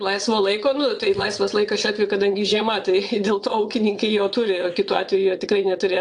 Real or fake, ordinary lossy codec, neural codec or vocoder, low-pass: fake; AAC, 64 kbps; vocoder, 22.05 kHz, 80 mel bands, WaveNeXt; 9.9 kHz